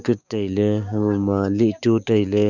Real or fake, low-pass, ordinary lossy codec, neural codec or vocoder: fake; 7.2 kHz; none; codec, 16 kHz, 6 kbps, DAC